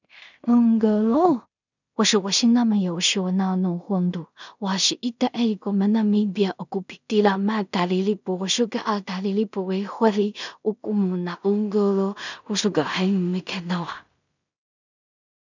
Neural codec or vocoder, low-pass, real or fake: codec, 16 kHz in and 24 kHz out, 0.4 kbps, LongCat-Audio-Codec, two codebook decoder; 7.2 kHz; fake